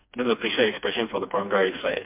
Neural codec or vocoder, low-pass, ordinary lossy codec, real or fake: codec, 16 kHz, 2 kbps, FreqCodec, smaller model; 3.6 kHz; MP3, 32 kbps; fake